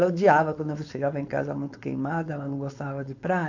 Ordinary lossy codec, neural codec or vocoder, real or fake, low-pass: AAC, 48 kbps; codec, 16 kHz, 4.8 kbps, FACodec; fake; 7.2 kHz